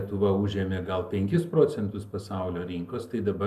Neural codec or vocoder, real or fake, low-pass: none; real; 14.4 kHz